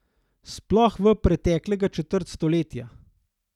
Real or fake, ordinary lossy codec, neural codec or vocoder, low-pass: real; none; none; 19.8 kHz